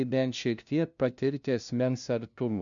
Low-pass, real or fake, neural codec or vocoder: 7.2 kHz; fake; codec, 16 kHz, 0.5 kbps, FunCodec, trained on LibriTTS, 25 frames a second